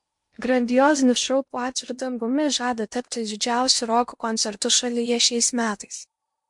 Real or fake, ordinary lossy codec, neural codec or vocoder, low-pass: fake; MP3, 64 kbps; codec, 16 kHz in and 24 kHz out, 0.8 kbps, FocalCodec, streaming, 65536 codes; 10.8 kHz